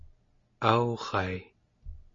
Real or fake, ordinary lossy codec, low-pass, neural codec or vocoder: real; MP3, 32 kbps; 7.2 kHz; none